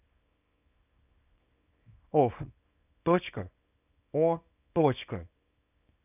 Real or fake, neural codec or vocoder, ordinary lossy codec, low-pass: fake; codec, 24 kHz, 0.9 kbps, WavTokenizer, small release; none; 3.6 kHz